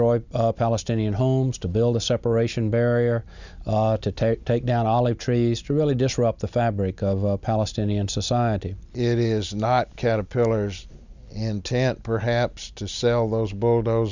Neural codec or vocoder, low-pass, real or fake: none; 7.2 kHz; real